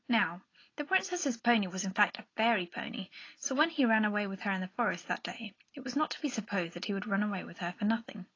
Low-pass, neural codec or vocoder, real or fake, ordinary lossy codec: 7.2 kHz; none; real; AAC, 32 kbps